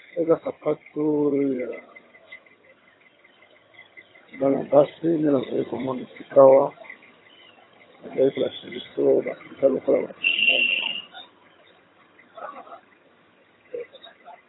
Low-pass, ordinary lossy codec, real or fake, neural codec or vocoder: 7.2 kHz; AAC, 16 kbps; fake; vocoder, 22.05 kHz, 80 mel bands, HiFi-GAN